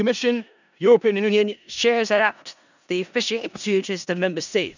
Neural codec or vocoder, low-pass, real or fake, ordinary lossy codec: codec, 16 kHz in and 24 kHz out, 0.4 kbps, LongCat-Audio-Codec, four codebook decoder; 7.2 kHz; fake; none